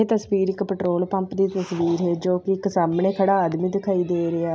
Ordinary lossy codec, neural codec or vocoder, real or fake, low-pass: none; none; real; none